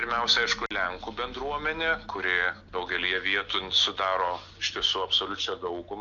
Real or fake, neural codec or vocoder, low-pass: real; none; 7.2 kHz